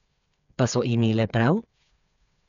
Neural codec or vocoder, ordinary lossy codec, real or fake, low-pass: codec, 16 kHz, 8 kbps, FreqCodec, smaller model; none; fake; 7.2 kHz